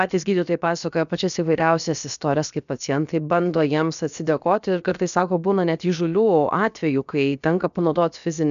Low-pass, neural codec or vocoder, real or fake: 7.2 kHz; codec, 16 kHz, about 1 kbps, DyCAST, with the encoder's durations; fake